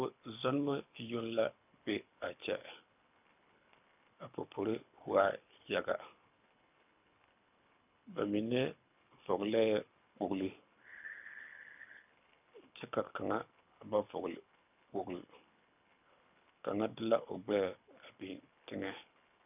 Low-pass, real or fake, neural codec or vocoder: 3.6 kHz; fake; codec, 24 kHz, 6 kbps, HILCodec